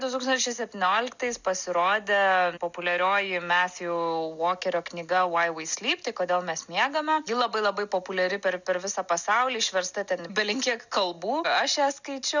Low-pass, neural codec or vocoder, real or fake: 7.2 kHz; none; real